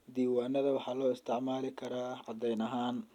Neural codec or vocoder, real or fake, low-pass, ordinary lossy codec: none; real; 19.8 kHz; none